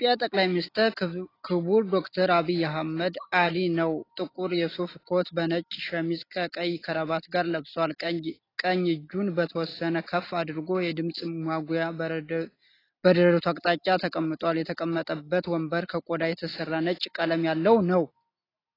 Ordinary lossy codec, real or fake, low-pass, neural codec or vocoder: AAC, 24 kbps; real; 5.4 kHz; none